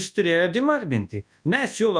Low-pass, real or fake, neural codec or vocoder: 9.9 kHz; fake; codec, 24 kHz, 0.9 kbps, WavTokenizer, large speech release